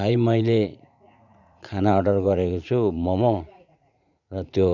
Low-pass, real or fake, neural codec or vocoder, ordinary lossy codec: 7.2 kHz; fake; vocoder, 44.1 kHz, 80 mel bands, Vocos; none